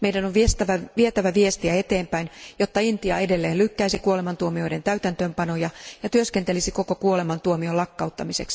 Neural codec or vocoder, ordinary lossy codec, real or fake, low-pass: none; none; real; none